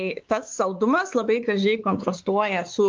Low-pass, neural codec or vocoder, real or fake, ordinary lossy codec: 7.2 kHz; codec, 16 kHz, 4 kbps, X-Codec, WavLM features, trained on Multilingual LibriSpeech; fake; Opus, 24 kbps